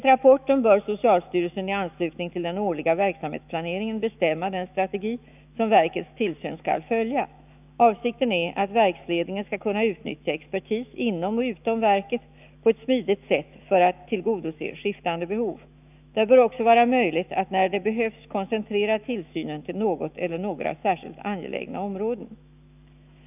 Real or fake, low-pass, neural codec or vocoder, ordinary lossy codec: fake; 3.6 kHz; autoencoder, 48 kHz, 128 numbers a frame, DAC-VAE, trained on Japanese speech; none